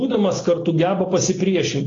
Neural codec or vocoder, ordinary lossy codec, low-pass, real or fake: none; AAC, 32 kbps; 7.2 kHz; real